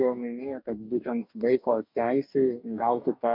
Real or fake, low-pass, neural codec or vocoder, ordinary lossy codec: fake; 5.4 kHz; codec, 44.1 kHz, 2.6 kbps, DAC; MP3, 48 kbps